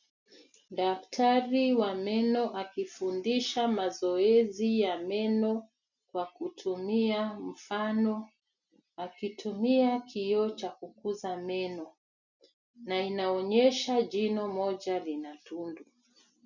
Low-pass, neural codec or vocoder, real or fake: 7.2 kHz; none; real